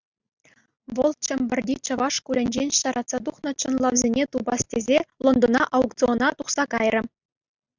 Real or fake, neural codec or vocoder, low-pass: real; none; 7.2 kHz